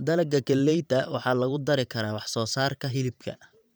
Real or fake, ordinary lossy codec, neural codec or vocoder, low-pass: fake; none; vocoder, 44.1 kHz, 128 mel bands every 512 samples, BigVGAN v2; none